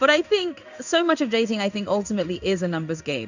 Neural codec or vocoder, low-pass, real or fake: codec, 16 kHz in and 24 kHz out, 1 kbps, XY-Tokenizer; 7.2 kHz; fake